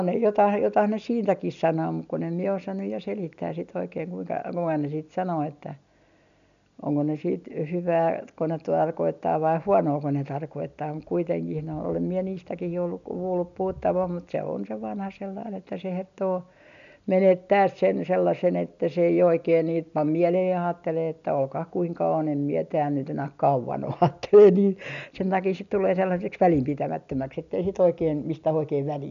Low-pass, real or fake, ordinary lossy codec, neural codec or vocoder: 7.2 kHz; real; none; none